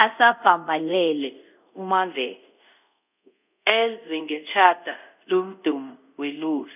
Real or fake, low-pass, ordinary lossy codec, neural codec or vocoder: fake; 3.6 kHz; none; codec, 24 kHz, 0.5 kbps, DualCodec